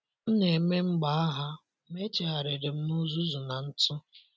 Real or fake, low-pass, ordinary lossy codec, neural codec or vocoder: real; none; none; none